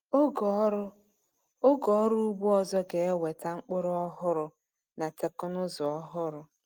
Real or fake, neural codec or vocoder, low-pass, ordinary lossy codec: real; none; 19.8 kHz; Opus, 24 kbps